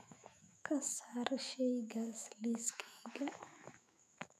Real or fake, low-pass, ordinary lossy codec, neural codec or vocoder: fake; 14.4 kHz; none; autoencoder, 48 kHz, 128 numbers a frame, DAC-VAE, trained on Japanese speech